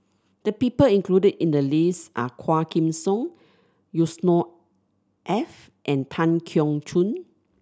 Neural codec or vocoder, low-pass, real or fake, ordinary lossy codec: none; none; real; none